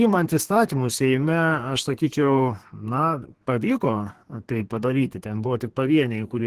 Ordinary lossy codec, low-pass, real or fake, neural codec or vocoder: Opus, 16 kbps; 14.4 kHz; fake; codec, 32 kHz, 1.9 kbps, SNAC